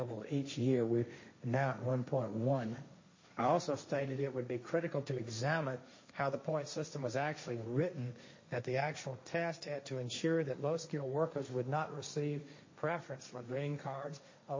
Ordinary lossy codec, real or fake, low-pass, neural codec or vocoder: MP3, 32 kbps; fake; 7.2 kHz; codec, 16 kHz, 1.1 kbps, Voila-Tokenizer